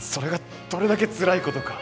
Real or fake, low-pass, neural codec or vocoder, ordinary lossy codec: real; none; none; none